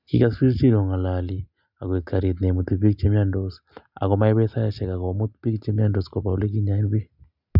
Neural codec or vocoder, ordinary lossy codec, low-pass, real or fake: none; none; 5.4 kHz; real